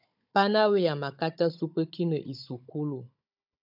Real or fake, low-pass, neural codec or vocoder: fake; 5.4 kHz; codec, 16 kHz, 16 kbps, FunCodec, trained on Chinese and English, 50 frames a second